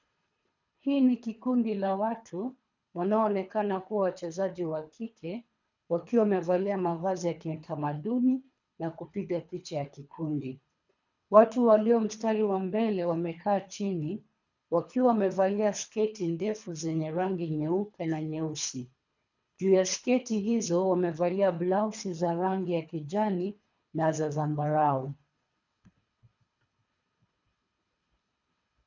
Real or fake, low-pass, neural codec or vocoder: fake; 7.2 kHz; codec, 24 kHz, 3 kbps, HILCodec